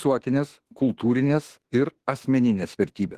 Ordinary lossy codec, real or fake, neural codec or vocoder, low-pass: Opus, 16 kbps; fake; autoencoder, 48 kHz, 32 numbers a frame, DAC-VAE, trained on Japanese speech; 14.4 kHz